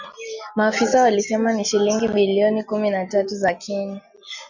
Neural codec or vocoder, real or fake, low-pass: none; real; 7.2 kHz